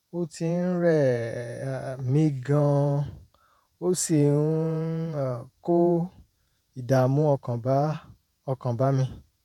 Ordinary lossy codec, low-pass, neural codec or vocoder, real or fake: none; 19.8 kHz; vocoder, 48 kHz, 128 mel bands, Vocos; fake